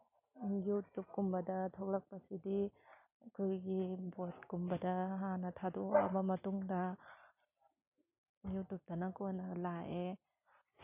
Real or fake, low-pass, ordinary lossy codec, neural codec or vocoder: real; 3.6 kHz; none; none